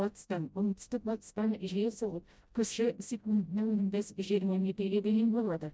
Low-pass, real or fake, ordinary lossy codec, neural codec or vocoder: none; fake; none; codec, 16 kHz, 0.5 kbps, FreqCodec, smaller model